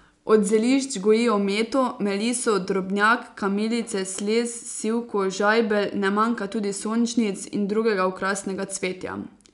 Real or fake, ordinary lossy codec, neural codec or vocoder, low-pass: real; none; none; 10.8 kHz